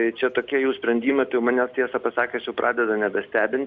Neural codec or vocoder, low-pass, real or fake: none; 7.2 kHz; real